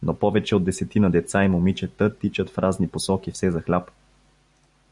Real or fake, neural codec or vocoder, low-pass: real; none; 10.8 kHz